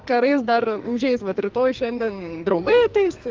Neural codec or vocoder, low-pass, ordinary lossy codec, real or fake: codec, 44.1 kHz, 2.6 kbps, SNAC; 7.2 kHz; Opus, 32 kbps; fake